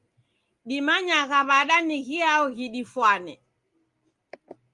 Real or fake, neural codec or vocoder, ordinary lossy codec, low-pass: real; none; Opus, 32 kbps; 10.8 kHz